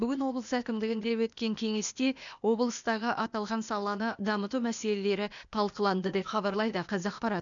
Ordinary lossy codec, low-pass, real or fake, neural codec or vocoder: none; 7.2 kHz; fake; codec, 16 kHz, 0.8 kbps, ZipCodec